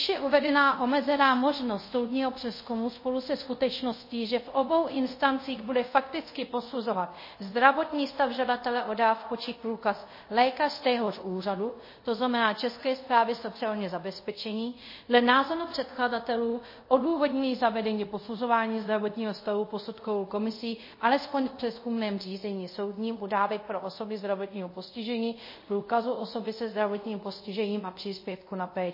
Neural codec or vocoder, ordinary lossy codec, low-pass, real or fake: codec, 24 kHz, 0.5 kbps, DualCodec; MP3, 24 kbps; 5.4 kHz; fake